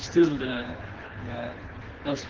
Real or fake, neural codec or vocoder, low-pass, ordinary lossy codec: fake; codec, 24 kHz, 3 kbps, HILCodec; 7.2 kHz; Opus, 16 kbps